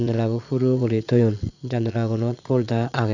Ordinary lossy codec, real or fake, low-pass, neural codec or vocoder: none; fake; 7.2 kHz; vocoder, 22.05 kHz, 80 mel bands, Vocos